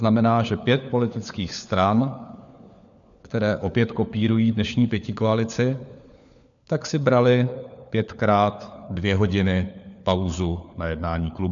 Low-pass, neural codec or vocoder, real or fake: 7.2 kHz; codec, 16 kHz, 4 kbps, FunCodec, trained on LibriTTS, 50 frames a second; fake